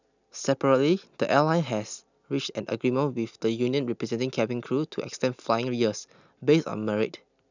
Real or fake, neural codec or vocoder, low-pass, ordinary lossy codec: real; none; 7.2 kHz; none